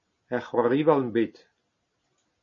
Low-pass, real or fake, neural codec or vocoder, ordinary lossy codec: 7.2 kHz; real; none; MP3, 32 kbps